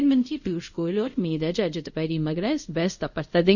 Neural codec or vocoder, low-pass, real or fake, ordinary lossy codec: codec, 24 kHz, 0.5 kbps, DualCodec; 7.2 kHz; fake; none